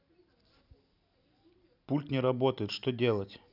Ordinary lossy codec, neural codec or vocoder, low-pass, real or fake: none; none; 5.4 kHz; real